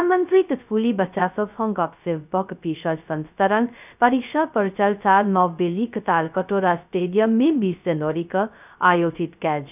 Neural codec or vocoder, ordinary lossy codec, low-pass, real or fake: codec, 16 kHz, 0.2 kbps, FocalCodec; none; 3.6 kHz; fake